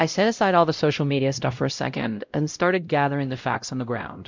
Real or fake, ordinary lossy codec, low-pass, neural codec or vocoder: fake; MP3, 64 kbps; 7.2 kHz; codec, 16 kHz, 0.5 kbps, X-Codec, WavLM features, trained on Multilingual LibriSpeech